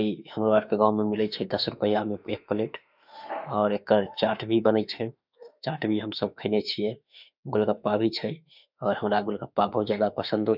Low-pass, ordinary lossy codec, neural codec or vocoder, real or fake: 5.4 kHz; none; autoencoder, 48 kHz, 32 numbers a frame, DAC-VAE, trained on Japanese speech; fake